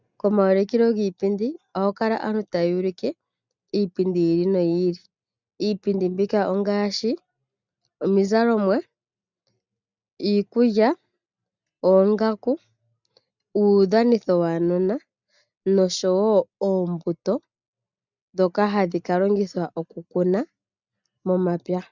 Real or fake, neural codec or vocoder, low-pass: real; none; 7.2 kHz